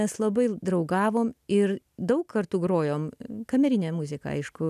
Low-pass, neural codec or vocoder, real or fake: 14.4 kHz; none; real